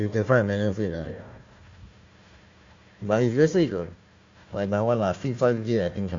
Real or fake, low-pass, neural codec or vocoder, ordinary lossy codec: fake; 7.2 kHz; codec, 16 kHz, 1 kbps, FunCodec, trained on Chinese and English, 50 frames a second; AAC, 48 kbps